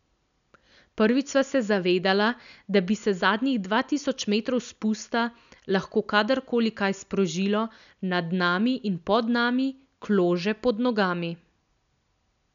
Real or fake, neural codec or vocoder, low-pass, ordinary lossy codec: real; none; 7.2 kHz; none